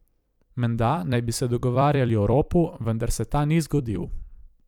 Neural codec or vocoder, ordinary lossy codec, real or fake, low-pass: vocoder, 44.1 kHz, 128 mel bands every 256 samples, BigVGAN v2; none; fake; 19.8 kHz